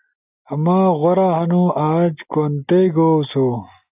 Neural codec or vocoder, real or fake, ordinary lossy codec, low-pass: none; real; AAC, 32 kbps; 3.6 kHz